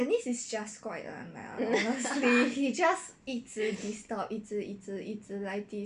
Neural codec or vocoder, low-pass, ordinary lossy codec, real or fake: none; 10.8 kHz; none; real